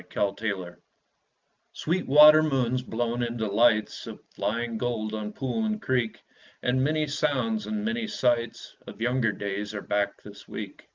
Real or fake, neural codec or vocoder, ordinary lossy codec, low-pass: real; none; Opus, 32 kbps; 7.2 kHz